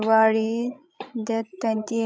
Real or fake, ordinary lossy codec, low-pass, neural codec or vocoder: fake; none; none; codec, 16 kHz, 16 kbps, FreqCodec, larger model